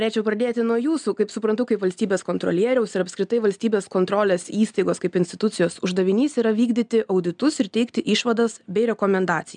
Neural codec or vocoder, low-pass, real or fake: none; 9.9 kHz; real